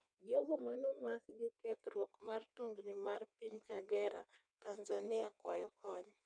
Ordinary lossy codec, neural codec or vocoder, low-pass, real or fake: none; codec, 16 kHz in and 24 kHz out, 1.1 kbps, FireRedTTS-2 codec; 9.9 kHz; fake